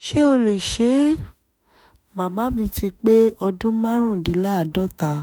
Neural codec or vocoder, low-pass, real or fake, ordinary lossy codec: autoencoder, 48 kHz, 32 numbers a frame, DAC-VAE, trained on Japanese speech; none; fake; none